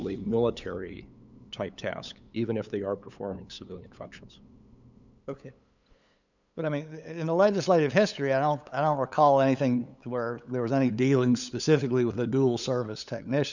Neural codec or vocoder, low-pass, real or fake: codec, 16 kHz, 8 kbps, FunCodec, trained on LibriTTS, 25 frames a second; 7.2 kHz; fake